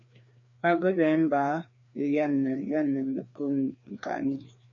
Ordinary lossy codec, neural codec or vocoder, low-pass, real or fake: MP3, 48 kbps; codec, 16 kHz, 2 kbps, FreqCodec, larger model; 7.2 kHz; fake